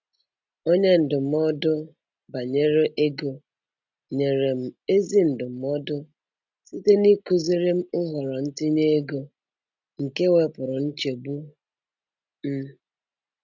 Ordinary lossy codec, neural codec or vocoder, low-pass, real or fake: none; none; 7.2 kHz; real